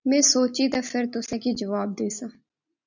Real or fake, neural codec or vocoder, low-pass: real; none; 7.2 kHz